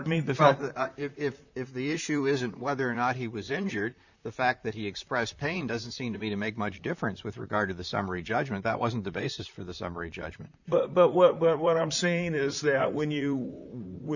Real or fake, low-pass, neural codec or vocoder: fake; 7.2 kHz; vocoder, 44.1 kHz, 128 mel bands, Pupu-Vocoder